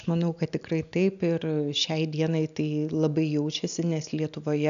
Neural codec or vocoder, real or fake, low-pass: none; real; 7.2 kHz